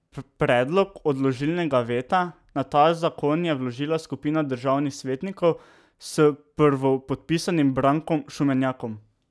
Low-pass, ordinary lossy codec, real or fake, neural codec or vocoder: none; none; real; none